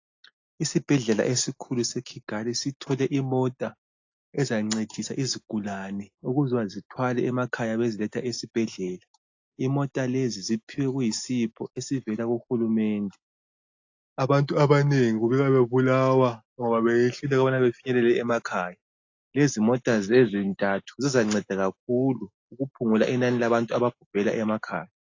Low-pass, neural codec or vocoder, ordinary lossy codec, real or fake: 7.2 kHz; none; AAC, 48 kbps; real